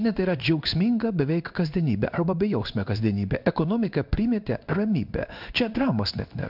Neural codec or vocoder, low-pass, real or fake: codec, 16 kHz in and 24 kHz out, 1 kbps, XY-Tokenizer; 5.4 kHz; fake